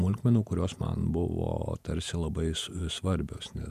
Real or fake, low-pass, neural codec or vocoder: fake; 14.4 kHz; vocoder, 48 kHz, 128 mel bands, Vocos